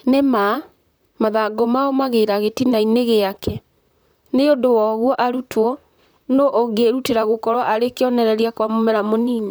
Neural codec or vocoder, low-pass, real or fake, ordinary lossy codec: vocoder, 44.1 kHz, 128 mel bands, Pupu-Vocoder; none; fake; none